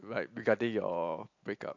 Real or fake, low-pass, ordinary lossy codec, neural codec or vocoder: fake; 7.2 kHz; AAC, 48 kbps; vocoder, 44.1 kHz, 128 mel bands every 256 samples, BigVGAN v2